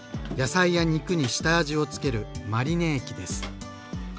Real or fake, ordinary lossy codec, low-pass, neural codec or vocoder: real; none; none; none